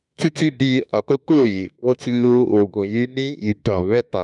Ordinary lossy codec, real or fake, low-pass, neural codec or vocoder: none; fake; 10.8 kHz; autoencoder, 48 kHz, 32 numbers a frame, DAC-VAE, trained on Japanese speech